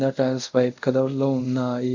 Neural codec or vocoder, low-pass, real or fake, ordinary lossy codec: codec, 24 kHz, 0.5 kbps, DualCodec; 7.2 kHz; fake; MP3, 64 kbps